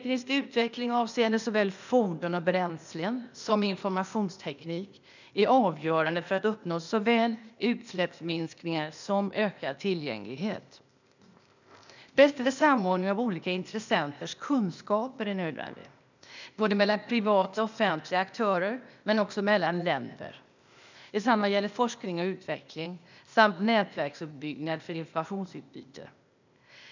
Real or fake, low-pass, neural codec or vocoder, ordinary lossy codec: fake; 7.2 kHz; codec, 16 kHz, 0.8 kbps, ZipCodec; none